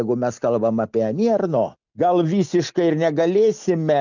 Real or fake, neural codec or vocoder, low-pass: real; none; 7.2 kHz